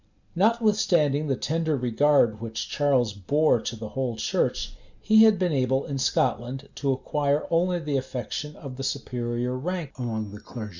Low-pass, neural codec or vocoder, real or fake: 7.2 kHz; none; real